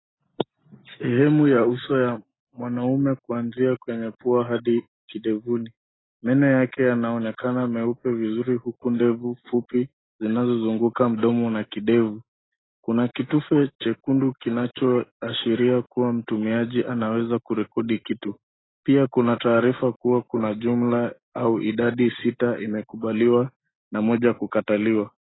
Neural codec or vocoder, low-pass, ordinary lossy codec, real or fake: none; 7.2 kHz; AAC, 16 kbps; real